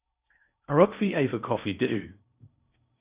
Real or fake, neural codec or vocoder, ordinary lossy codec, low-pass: fake; codec, 16 kHz in and 24 kHz out, 0.6 kbps, FocalCodec, streaming, 4096 codes; Opus, 64 kbps; 3.6 kHz